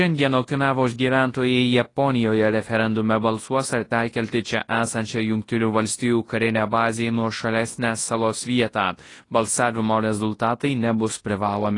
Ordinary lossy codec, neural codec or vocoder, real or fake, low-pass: AAC, 32 kbps; codec, 24 kHz, 0.9 kbps, WavTokenizer, large speech release; fake; 10.8 kHz